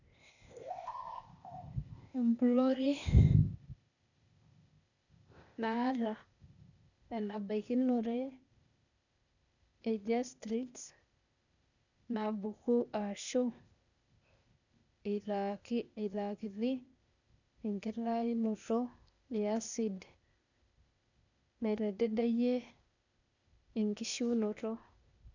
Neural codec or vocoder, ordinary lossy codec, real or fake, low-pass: codec, 16 kHz, 0.8 kbps, ZipCodec; none; fake; 7.2 kHz